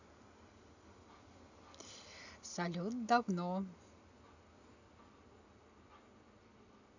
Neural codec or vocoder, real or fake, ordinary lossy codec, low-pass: none; real; none; 7.2 kHz